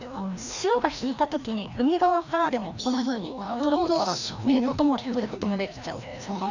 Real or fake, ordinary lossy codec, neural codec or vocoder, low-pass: fake; none; codec, 16 kHz, 1 kbps, FreqCodec, larger model; 7.2 kHz